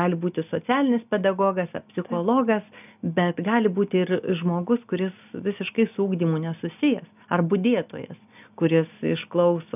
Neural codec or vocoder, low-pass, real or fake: none; 3.6 kHz; real